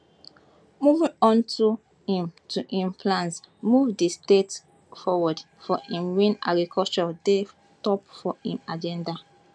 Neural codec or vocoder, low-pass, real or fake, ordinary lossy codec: none; none; real; none